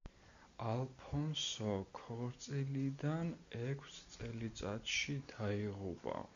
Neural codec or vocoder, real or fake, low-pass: none; real; 7.2 kHz